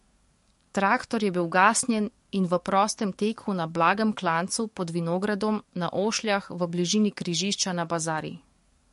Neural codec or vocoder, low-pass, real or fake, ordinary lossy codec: codec, 44.1 kHz, 7.8 kbps, DAC; 14.4 kHz; fake; MP3, 48 kbps